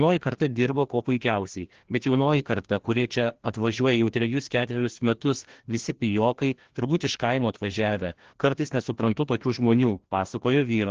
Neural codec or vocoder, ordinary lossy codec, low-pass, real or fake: codec, 16 kHz, 1 kbps, FreqCodec, larger model; Opus, 16 kbps; 7.2 kHz; fake